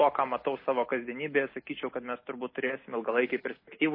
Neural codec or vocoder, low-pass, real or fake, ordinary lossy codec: none; 5.4 kHz; real; MP3, 24 kbps